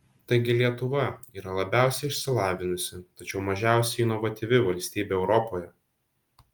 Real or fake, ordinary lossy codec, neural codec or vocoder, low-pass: real; Opus, 32 kbps; none; 19.8 kHz